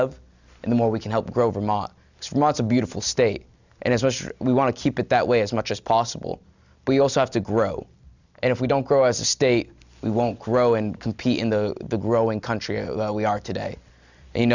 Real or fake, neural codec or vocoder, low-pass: real; none; 7.2 kHz